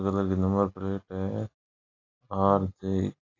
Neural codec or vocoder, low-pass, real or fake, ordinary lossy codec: none; 7.2 kHz; real; AAC, 32 kbps